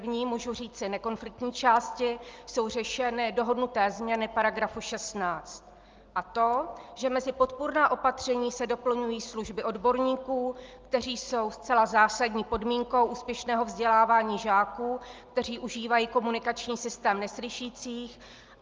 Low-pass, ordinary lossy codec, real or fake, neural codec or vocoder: 7.2 kHz; Opus, 24 kbps; real; none